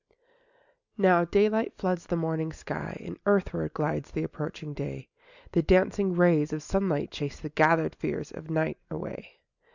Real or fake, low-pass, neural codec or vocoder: real; 7.2 kHz; none